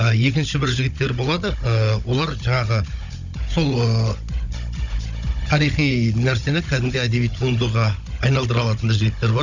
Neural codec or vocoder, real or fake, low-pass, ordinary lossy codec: codec, 16 kHz, 16 kbps, FunCodec, trained on Chinese and English, 50 frames a second; fake; 7.2 kHz; none